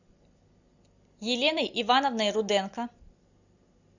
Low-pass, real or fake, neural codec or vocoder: 7.2 kHz; real; none